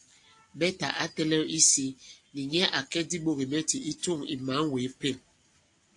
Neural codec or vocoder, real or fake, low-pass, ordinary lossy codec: none; real; 10.8 kHz; AAC, 48 kbps